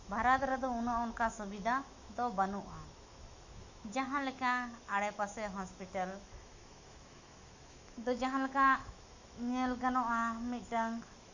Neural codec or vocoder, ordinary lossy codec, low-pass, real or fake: autoencoder, 48 kHz, 128 numbers a frame, DAC-VAE, trained on Japanese speech; Opus, 64 kbps; 7.2 kHz; fake